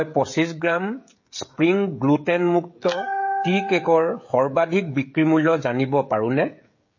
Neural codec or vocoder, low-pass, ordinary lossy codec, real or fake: codec, 16 kHz, 16 kbps, FreqCodec, smaller model; 7.2 kHz; MP3, 32 kbps; fake